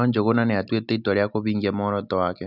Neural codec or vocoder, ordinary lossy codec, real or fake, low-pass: none; none; real; 5.4 kHz